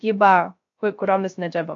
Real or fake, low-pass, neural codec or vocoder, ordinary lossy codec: fake; 7.2 kHz; codec, 16 kHz, 0.3 kbps, FocalCodec; AAC, 48 kbps